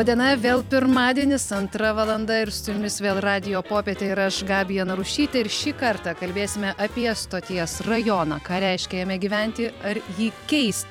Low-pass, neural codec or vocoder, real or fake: 19.8 kHz; vocoder, 44.1 kHz, 128 mel bands every 256 samples, BigVGAN v2; fake